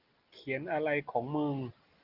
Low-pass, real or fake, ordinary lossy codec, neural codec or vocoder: 5.4 kHz; real; Opus, 32 kbps; none